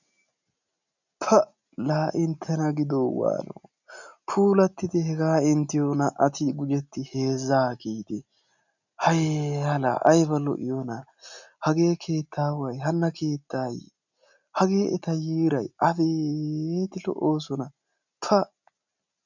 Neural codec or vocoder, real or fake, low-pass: none; real; 7.2 kHz